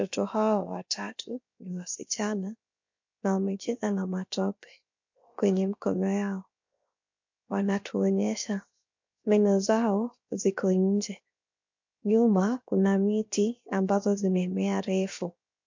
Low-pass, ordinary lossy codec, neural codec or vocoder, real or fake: 7.2 kHz; MP3, 48 kbps; codec, 16 kHz, 0.7 kbps, FocalCodec; fake